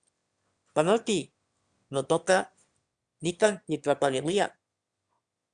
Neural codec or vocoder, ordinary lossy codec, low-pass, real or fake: autoencoder, 22.05 kHz, a latent of 192 numbers a frame, VITS, trained on one speaker; Opus, 64 kbps; 9.9 kHz; fake